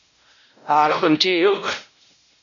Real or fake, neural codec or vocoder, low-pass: fake; codec, 16 kHz, 0.5 kbps, X-Codec, WavLM features, trained on Multilingual LibriSpeech; 7.2 kHz